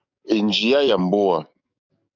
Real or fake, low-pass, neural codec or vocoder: fake; 7.2 kHz; codec, 44.1 kHz, 7.8 kbps, DAC